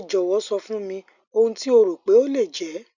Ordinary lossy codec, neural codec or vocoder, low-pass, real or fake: none; none; 7.2 kHz; real